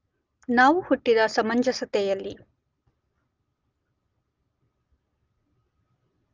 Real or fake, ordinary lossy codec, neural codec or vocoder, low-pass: real; Opus, 32 kbps; none; 7.2 kHz